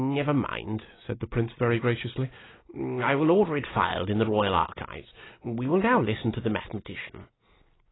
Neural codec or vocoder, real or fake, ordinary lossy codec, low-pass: none; real; AAC, 16 kbps; 7.2 kHz